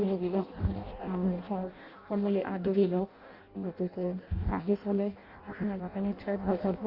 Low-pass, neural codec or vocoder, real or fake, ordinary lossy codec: 5.4 kHz; codec, 16 kHz in and 24 kHz out, 0.6 kbps, FireRedTTS-2 codec; fake; Opus, 64 kbps